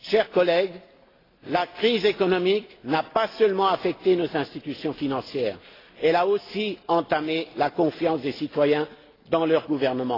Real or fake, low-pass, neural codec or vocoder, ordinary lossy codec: real; 5.4 kHz; none; AAC, 24 kbps